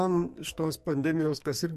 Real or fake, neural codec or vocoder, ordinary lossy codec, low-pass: fake; codec, 44.1 kHz, 2.6 kbps, SNAC; MP3, 64 kbps; 14.4 kHz